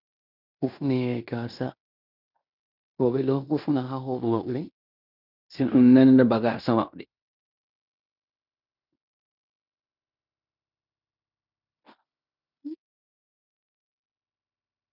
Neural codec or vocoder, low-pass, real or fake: codec, 16 kHz in and 24 kHz out, 0.9 kbps, LongCat-Audio-Codec, fine tuned four codebook decoder; 5.4 kHz; fake